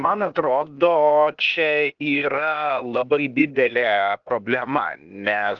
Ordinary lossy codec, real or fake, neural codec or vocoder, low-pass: Opus, 24 kbps; fake; codec, 16 kHz, 0.8 kbps, ZipCodec; 7.2 kHz